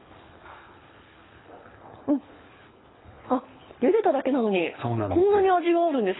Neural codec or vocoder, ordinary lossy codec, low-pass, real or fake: codec, 24 kHz, 6 kbps, HILCodec; AAC, 16 kbps; 7.2 kHz; fake